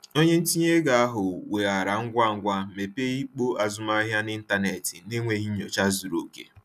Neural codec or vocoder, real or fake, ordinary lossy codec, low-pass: none; real; none; 14.4 kHz